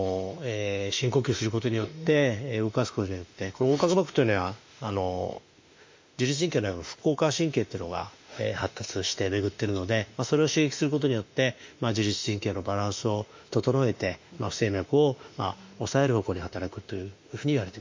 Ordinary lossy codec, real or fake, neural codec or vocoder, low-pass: MP3, 48 kbps; fake; autoencoder, 48 kHz, 32 numbers a frame, DAC-VAE, trained on Japanese speech; 7.2 kHz